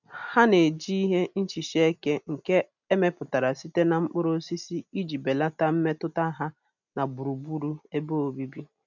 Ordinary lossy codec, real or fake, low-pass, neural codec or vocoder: none; real; 7.2 kHz; none